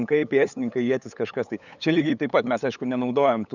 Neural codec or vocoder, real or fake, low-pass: codec, 16 kHz, 8 kbps, FunCodec, trained on LibriTTS, 25 frames a second; fake; 7.2 kHz